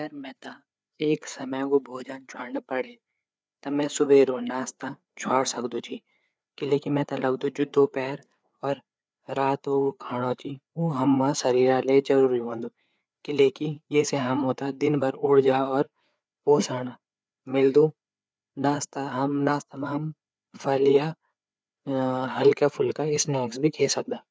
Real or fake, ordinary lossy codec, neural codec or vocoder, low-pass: fake; none; codec, 16 kHz, 4 kbps, FreqCodec, larger model; none